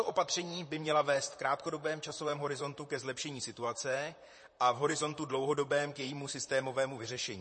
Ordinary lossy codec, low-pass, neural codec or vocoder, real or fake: MP3, 32 kbps; 10.8 kHz; vocoder, 44.1 kHz, 128 mel bands, Pupu-Vocoder; fake